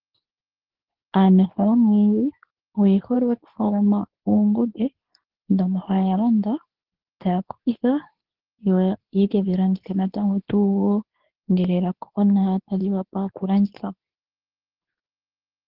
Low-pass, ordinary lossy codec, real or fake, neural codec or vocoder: 5.4 kHz; Opus, 16 kbps; fake; codec, 24 kHz, 0.9 kbps, WavTokenizer, medium speech release version 2